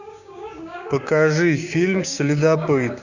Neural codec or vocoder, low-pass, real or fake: codec, 16 kHz, 6 kbps, DAC; 7.2 kHz; fake